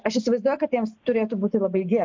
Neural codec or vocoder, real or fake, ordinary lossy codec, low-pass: none; real; MP3, 64 kbps; 7.2 kHz